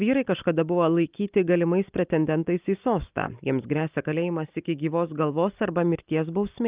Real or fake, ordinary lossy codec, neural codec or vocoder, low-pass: real; Opus, 24 kbps; none; 3.6 kHz